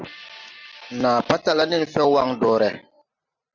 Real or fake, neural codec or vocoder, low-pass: real; none; 7.2 kHz